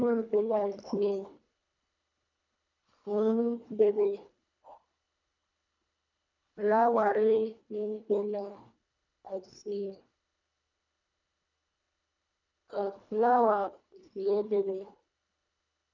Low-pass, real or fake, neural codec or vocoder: 7.2 kHz; fake; codec, 24 kHz, 1.5 kbps, HILCodec